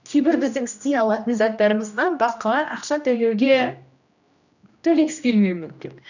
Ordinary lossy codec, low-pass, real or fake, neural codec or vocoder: none; 7.2 kHz; fake; codec, 16 kHz, 1 kbps, X-Codec, HuBERT features, trained on general audio